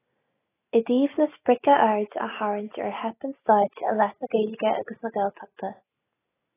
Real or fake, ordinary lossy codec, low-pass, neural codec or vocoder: real; AAC, 16 kbps; 3.6 kHz; none